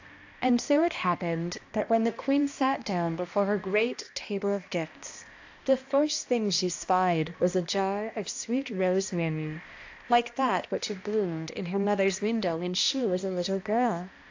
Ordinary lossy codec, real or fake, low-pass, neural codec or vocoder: AAC, 48 kbps; fake; 7.2 kHz; codec, 16 kHz, 1 kbps, X-Codec, HuBERT features, trained on balanced general audio